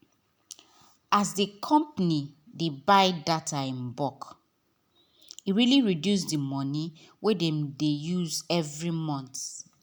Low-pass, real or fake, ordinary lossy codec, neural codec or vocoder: none; real; none; none